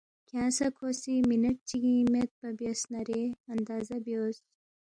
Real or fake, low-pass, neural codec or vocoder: real; 9.9 kHz; none